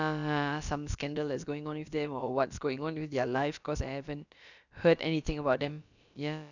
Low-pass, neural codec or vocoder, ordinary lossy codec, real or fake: 7.2 kHz; codec, 16 kHz, about 1 kbps, DyCAST, with the encoder's durations; none; fake